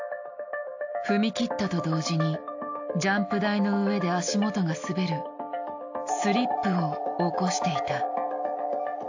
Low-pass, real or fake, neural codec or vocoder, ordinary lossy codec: 7.2 kHz; real; none; AAC, 48 kbps